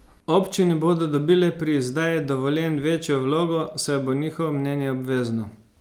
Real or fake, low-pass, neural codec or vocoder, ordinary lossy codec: real; 19.8 kHz; none; Opus, 32 kbps